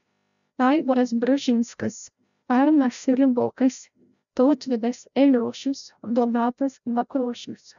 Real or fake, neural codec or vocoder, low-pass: fake; codec, 16 kHz, 0.5 kbps, FreqCodec, larger model; 7.2 kHz